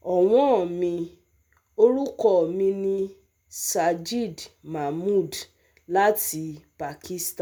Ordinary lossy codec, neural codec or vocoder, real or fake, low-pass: none; none; real; none